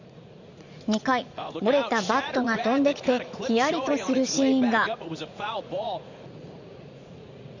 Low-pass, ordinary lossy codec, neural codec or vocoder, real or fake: 7.2 kHz; none; vocoder, 44.1 kHz, 80 mel bands, Vocos; fake